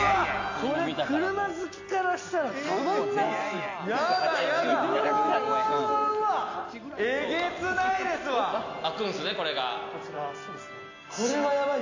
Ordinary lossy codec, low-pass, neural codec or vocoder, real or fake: AAC, 48 kbps; 7.2 kHz; none; real